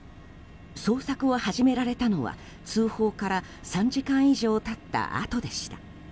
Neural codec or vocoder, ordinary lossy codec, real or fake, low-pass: none; none; real; none